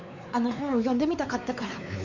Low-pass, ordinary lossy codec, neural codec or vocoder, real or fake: 7.2 kHz; none; codec, 16 kHz, 4 kbps, X-Codec, WavLM features, trained on Multilingual LibriSpeech; fake